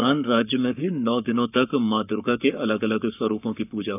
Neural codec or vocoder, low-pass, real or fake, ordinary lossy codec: codec, 44.1 kHz, 7.8 kbps, Pupu-Codec; 3.6 kHz; fake; none